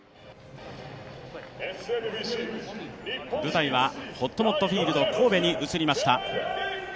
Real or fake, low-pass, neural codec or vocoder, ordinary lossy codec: real; none; none; none